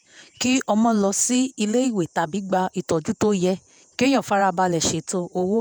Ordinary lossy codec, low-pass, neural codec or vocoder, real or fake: none; none; vocoder, 48 kHz, 128 mel bands, Vocos; fake